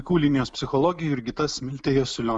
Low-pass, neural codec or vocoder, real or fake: 10.8 kHz; none; real